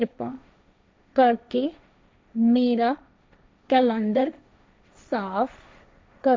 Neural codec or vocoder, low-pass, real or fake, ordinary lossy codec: codec, 16 kHz, 1.1 kbps, Voila-Tokenizer; none; fake; none